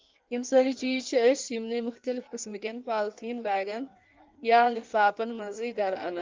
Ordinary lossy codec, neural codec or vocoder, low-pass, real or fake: Opus, 24 kbps; codec, 16 kHz in and 24 kHz out, 1.1 kbps, FireRedTTS-2 codec; 7.2 kHz; fake